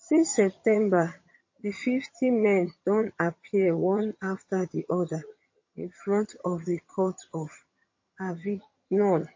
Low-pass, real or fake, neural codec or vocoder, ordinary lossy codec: 7.2 kHz; fake; vocoder, 22.05 kHz, 80 mel bands, HiFi-GAN; MP3, 32 kbps